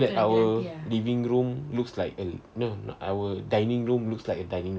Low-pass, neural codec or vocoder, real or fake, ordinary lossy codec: none; none; real; none